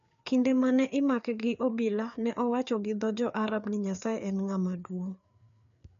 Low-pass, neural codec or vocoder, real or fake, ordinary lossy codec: 7.2 kHz; codec, 16 kHz, 4 kbps, FreqCodec, larger model; fake; MP3, 96 kbps